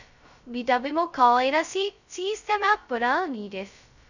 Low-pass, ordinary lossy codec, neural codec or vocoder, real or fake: 7.2 kHz; none; codec, 16 kHz, 0.2 kbps, FocalCodec; fake